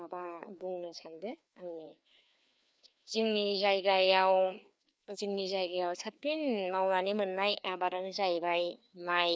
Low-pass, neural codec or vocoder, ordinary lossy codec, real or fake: none; codec, 16 kHz, 2 kbps, FreqCodec, larger model; none; fake